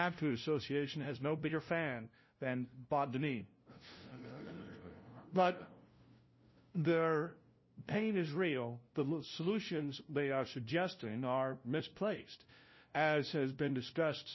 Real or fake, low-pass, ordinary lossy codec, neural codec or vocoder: fake; 7.2 kHz; MP3, 24 kbps; codec, 16 kHz, 0.5 kbps, FunCodec, trained on LibriTTS, 25 frames a second